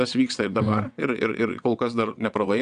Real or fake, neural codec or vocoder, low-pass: fake; vocoder, 22.05 kHz, 80 mel bands, WaveNeXt; 9.9 kHz